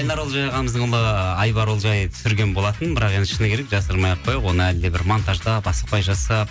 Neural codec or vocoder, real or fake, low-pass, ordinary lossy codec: none; real; none; none